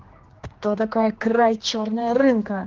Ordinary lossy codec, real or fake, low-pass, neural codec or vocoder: Opus, 16 kbps; fake; 7.2 kHz; codec, 16 kHz, 2 kbps, X-Codec, HuBERT features, trained on general audio